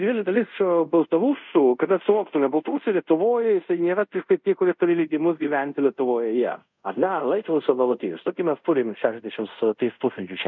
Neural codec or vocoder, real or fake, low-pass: codec, 24 kHz, 0.5 kbps, DualCodec; fake; 7.2 kHz